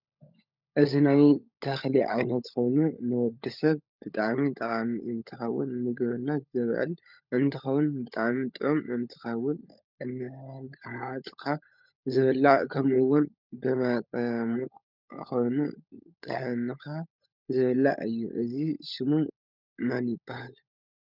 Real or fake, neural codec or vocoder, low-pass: fake; codec, 16 kHz, 16 kbps, FunCodec, trained on LibriTTS, 50 frames a second; 5.4 kHz